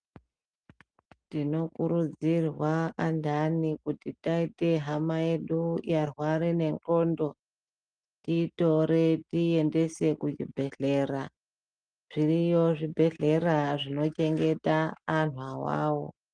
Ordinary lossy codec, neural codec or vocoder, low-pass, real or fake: Opus, 32 kbps; none; 9.9 kHz; real